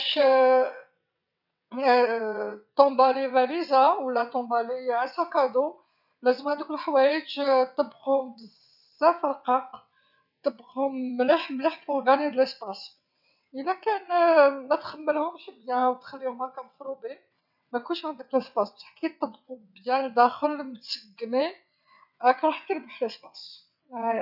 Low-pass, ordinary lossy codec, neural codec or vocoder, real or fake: 5.4 kHz; none; vocoder, 22.05 kHz, 80 mel bands, WaveNeXt; fake